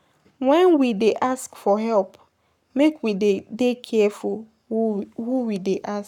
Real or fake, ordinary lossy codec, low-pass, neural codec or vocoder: fake; none; 19.8 kHz; codec, 44.1 kHz, 7.8 kbps, Pupu-Codec